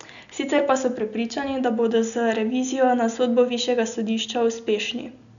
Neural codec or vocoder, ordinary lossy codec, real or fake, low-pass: none; none; real; 7.2 kHz